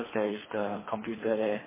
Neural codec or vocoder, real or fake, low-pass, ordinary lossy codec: codec, 24 kHz, 3 kbps, HILCodec; fake; 3.6 kHz; MP3, 16 kbps